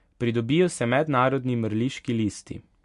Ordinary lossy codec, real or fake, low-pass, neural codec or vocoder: MP3, 64 kbps; real; 10.8 kHz; none